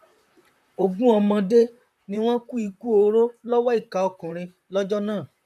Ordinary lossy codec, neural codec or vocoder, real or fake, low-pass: none; vocoder, 44.1 kHz, 128 mel bands, Pupu-Vocoder; fake; 14.4 kHz